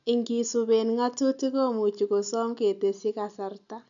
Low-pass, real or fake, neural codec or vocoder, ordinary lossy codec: 7.2 kHz; real; none; none